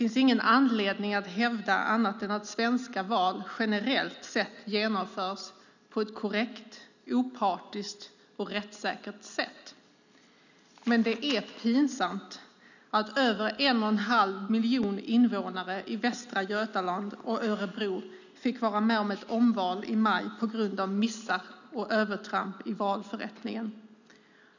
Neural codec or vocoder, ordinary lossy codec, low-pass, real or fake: none; none; 7.2 kHz; real